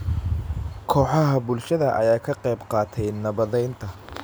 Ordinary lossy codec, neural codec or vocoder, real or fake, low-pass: none; none; real; none